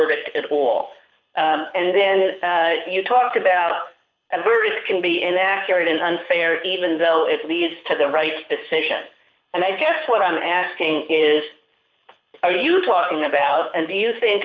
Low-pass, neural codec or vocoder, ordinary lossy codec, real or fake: 7.2 kHz; codec, 24 kHz, 6 kbps, HILCodec; MP3, 64 kbps; fake